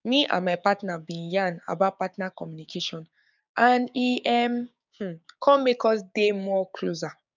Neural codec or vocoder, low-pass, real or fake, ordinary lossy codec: codec, 16 kHz, 6 kbps, DAC; 7.2 kHz; fake; none